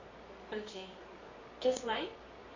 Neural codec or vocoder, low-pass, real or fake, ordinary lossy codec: codec, 24 kHz, 0.9 kbps, WavTokenizer, medium music audio release; 7.2 kHz; fake; MP3, 32 kbps